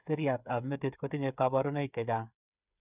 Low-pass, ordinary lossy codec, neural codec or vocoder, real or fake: 3.6 kHz; none; codec, 16 kHz, 8 kbps, FreqCodec, smaller model; fake